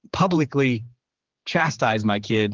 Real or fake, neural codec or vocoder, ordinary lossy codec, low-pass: fake; codec, 16 kHz, 4 kbps, X-Codec, HuBERT features, trained on general audio; Opus, 16 kbps; 7.2 kHz